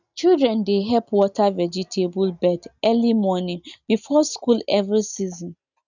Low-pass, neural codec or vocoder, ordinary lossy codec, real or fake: 7.2 kHz; none; none; real